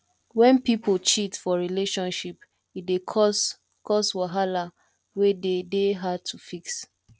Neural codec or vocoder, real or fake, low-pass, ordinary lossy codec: none; real; none; none